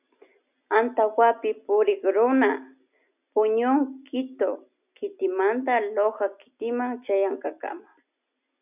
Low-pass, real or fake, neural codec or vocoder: 3.6 kHz; real; none